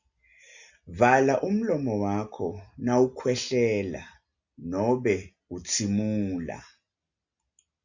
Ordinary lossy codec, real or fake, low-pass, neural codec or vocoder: Opus, 64 kbps; real; 7.2 kHz; none